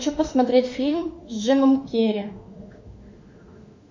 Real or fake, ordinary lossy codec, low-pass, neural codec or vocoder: fake; MP3, 64 kbps; 7.2 kHz; autoencoder, 48 kHz, 32 numbers a frame, DAC-VAE, trained on Japanese speech